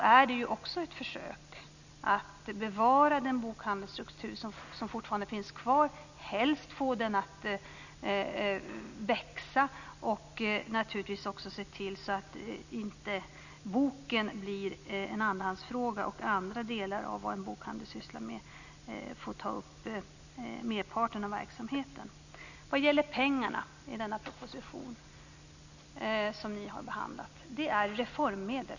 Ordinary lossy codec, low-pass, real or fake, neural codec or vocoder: none; 7.2 kHz; real; none